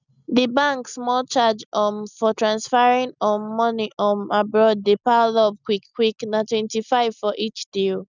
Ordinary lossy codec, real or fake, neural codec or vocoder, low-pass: none; real; none; 7.2 kHz